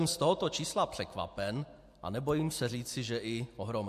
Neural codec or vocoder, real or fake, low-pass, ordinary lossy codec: none; real; 14.4 kHz; MP3, 64 kbps